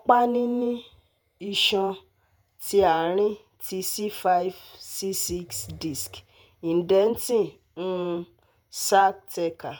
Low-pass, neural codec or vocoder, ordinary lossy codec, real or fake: none; vocoder, 48 kHz, 128 mel bands, Vocos; none; fake